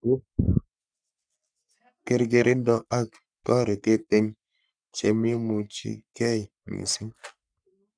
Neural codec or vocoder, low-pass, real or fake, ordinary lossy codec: codec, 44.1 kHz, 3.4 kbps, Pupu-Codec; 9.9 kHz; fake; none